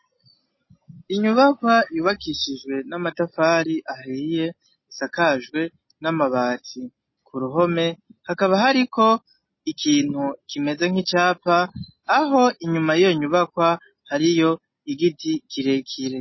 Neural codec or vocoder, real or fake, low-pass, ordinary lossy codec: none; real; 7.2 kHz; MP3, 24 kbps